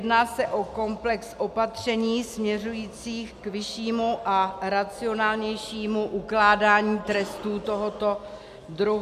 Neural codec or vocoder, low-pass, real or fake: none; 14.4 kHz; real